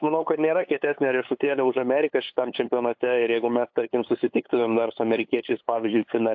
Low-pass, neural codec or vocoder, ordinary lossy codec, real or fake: 7.2 kHz; codec, 16 kHz, 8 kbps, FunCodec, trained on LibriTTS, 25 frames a second; AAC, 48 kbps; fake